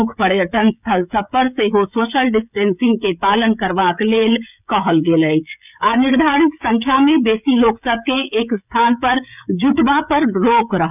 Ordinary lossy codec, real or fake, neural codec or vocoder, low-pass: none; fake; codec, 16 kHz, 16 kbps, FreqCodec, smaller model; 3.6 kHz